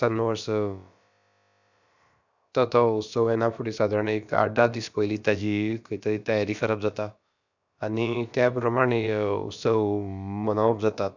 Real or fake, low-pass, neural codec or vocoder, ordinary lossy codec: fake; 7.2 kHz; codec, 16 kHz, about 1 kbps, DyCAST, with the encoder's durations; none